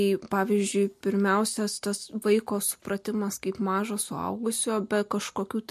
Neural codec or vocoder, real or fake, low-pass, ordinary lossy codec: none; real; 14.4 kHz; MP3, 64 kbps